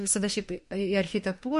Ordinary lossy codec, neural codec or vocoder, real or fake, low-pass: MP3, 48 kbps; autoencoder, 48 kHz, 32 numbers a frame, DAC-VAE, trained on Japanese speech; fake; 14.4 kHz